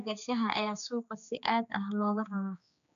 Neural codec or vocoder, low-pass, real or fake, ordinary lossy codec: codec, 16 kHz, 2 kbps, X-Codec, HuBERT features, trained on general audio; 7.2 kHz; fake; none